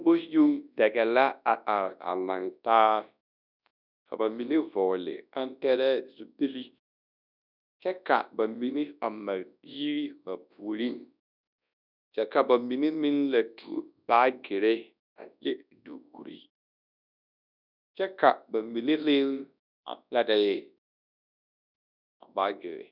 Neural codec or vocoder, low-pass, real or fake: codec, 24 kHz, 0.9 kbps, WavTokenizer, large speech release; 5.4 kHz; fake